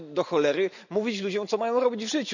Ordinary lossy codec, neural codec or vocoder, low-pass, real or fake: none; none; 7.2 kHz; real